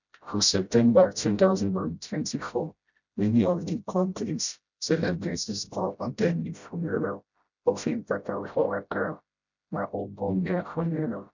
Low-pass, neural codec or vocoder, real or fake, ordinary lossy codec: 7.2 kHz; codec, 16 kHz, 0.5 kbps, FreqCodec, smaller model; fake; none